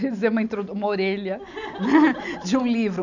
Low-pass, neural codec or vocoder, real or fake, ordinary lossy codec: 7.2 kHz; none; real; none